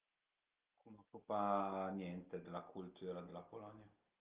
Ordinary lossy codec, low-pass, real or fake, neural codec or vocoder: Opus, 32 kbps; 3.6 kHz; real; none